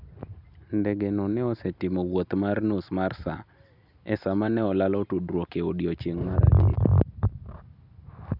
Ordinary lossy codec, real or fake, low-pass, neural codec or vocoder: none; real; 5.4 kHz; none